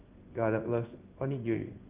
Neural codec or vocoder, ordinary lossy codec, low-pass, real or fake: codec, 16 kHz in and 24 kHz out, 1 kbps, XY-Tokenizer; Opus, 24 kbps; 3.6 kHz; fake